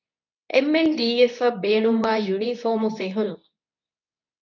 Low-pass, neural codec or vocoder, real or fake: 7.2 kHz; codec, 24 kHz, 0.9 kbps, WavTokenizer, medium speech release version 2; fake